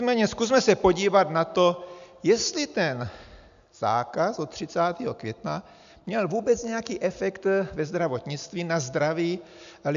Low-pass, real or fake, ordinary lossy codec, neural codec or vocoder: 7.2 kHz; real; AAC, 96 kbps; none